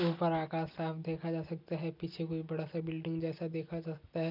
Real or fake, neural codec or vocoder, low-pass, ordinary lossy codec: real; none; 5.4 kHz; none